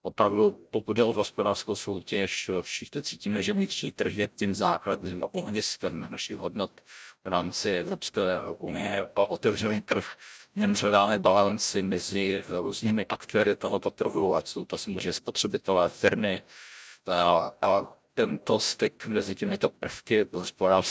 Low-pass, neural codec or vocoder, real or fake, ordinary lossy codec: none; codec, 16 kHz, 0.5 kbps, FreqCodec, larger model; fake; none